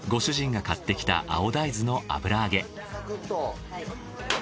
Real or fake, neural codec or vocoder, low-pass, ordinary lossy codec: real; none; none; none